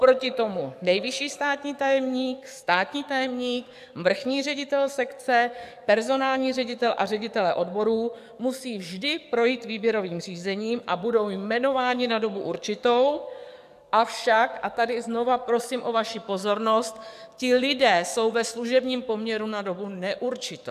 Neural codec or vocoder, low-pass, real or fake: codec, 44.1 kHz, 7.8 kbps, DAC; 14.4 kHz; fake